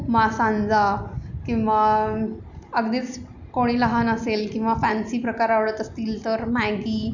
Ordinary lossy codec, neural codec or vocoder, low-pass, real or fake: none; none; 7.2 kHz; real